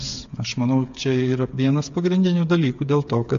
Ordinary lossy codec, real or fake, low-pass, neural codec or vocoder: AAC, 64 kbps; fake; 7.2 kHz; codec, 16 kHz, 8 kbps, FreqCodec, smaller model